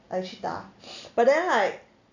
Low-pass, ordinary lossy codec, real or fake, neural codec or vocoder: 7.2 kHz; none; real; none